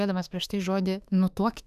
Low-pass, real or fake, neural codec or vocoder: 14.4 kHz; fake; autoencoder, 48 kHz, 32 numbers a frame, DAC-VAE, trained on Japanese speech